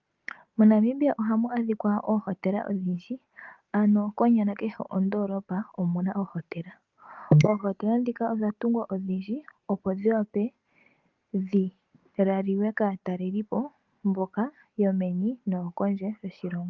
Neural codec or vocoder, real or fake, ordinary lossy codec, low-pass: none; real; Opus, 32 kbps; 7.2 kHz